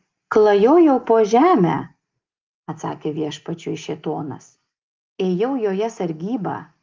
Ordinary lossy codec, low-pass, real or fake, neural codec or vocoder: Opus, 32 kbps; 7.2 kHz; real; none